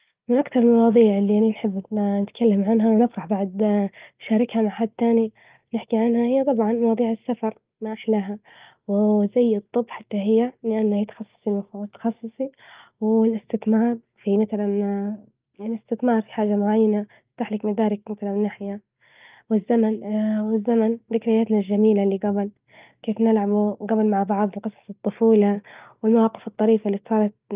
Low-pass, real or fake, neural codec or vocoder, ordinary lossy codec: 3.6 kHz; real; none; Opus, 24 kbps